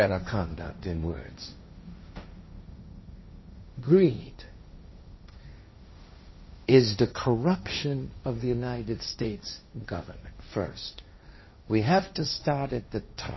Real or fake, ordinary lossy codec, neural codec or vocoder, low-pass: fake; MP3, 24 kbps; codec, 16 kHz, 1.1 kbps, Voila-Tokenizer; 7.2 kHz